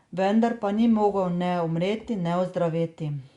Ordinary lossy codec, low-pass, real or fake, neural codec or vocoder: Opus, 64 kbps; 10.8 kHz; real; none